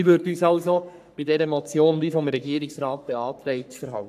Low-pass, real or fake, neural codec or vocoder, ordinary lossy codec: 14.4 kHz; fake; codec, 44.1 kHz, 3.4 kbps, Pupu-Codec; AAC, 96 kbps